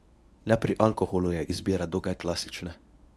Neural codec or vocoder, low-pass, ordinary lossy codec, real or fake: codec, 24 kHz, 0.9 kbps, WavTokenizer, medium speech release version 1; none; none; fake